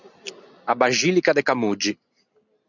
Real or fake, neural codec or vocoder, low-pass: real; none; 7.2 kHz